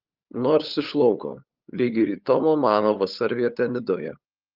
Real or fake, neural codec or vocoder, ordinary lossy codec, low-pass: fake; codec, 16 kHz, 8 kbps, FunCodec, trained on LibriTTS, 25 frames a second; Opus, 32 kbps; 5.4 kHz